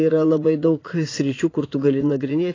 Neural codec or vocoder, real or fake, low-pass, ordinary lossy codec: vocoder, 44.1 kHz, 80 mel bands, Vocos; fake; 7.2 kHz; AAC, 32 kbps